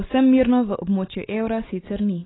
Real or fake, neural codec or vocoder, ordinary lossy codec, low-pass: real; none; AAC, 16 kbps; 7.2 kHz